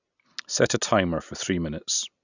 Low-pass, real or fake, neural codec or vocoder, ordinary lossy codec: 7.2 kHz; real; none; none